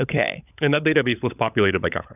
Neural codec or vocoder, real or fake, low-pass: codec, 16 kHz, 16 kbps, FunCodec, trained on LibriTTS, 50 frames a second; fake; 3.6 kHz